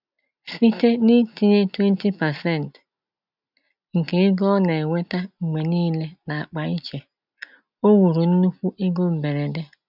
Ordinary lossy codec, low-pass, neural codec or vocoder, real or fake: none; 5.4 kHz; none; real